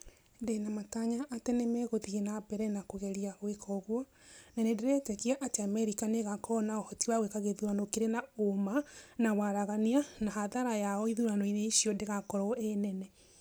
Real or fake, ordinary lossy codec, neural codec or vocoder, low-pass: real; none; none; none